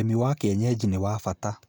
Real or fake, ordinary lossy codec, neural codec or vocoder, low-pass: real; none; none; none